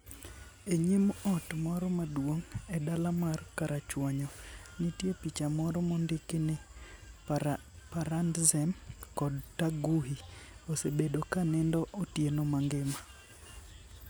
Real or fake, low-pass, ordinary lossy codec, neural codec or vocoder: real; none; none; none